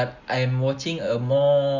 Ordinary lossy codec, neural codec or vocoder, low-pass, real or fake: none; none; 7.2 kHz; real